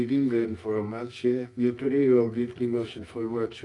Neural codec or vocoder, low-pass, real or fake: codec, 24 kHz, 0.9 kbps, WavTokenizer, medium music audio release; 10.8 kHz; fake